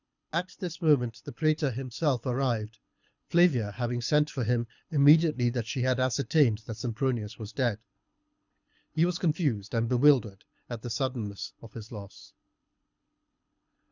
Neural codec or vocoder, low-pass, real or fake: codec, 24 kHz, 6 kbps, HILCodec; 7.2 kHz; fake